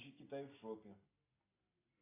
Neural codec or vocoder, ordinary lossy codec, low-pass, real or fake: none; AAC, 24 kbps; 3.6 kHz; real